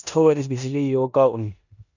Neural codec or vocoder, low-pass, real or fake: codec, 16 kHz in and 24 kHz out, 0.9 kbps, LongCat-Audio-Codec, four codebook decoder; 7.2 kHz; fake